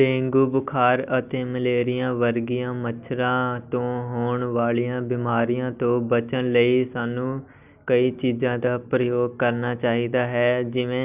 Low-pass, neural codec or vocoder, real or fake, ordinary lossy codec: 3.6 kHz; none; real; none